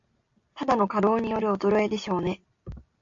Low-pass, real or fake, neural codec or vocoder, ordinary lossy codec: 7.2 kHz; real; none; AAC, 64 kbps